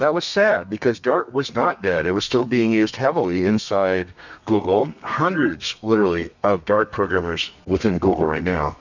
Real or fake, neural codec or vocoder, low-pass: fake; codec, 32 kHz, 1.9 kbps, SNAC; 7.2 kHz